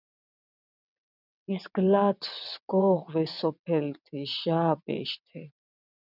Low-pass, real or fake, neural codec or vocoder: 5.4 kHz; fake; vocoder, 22.05 kHz, 80 mel bands, WaveNeXt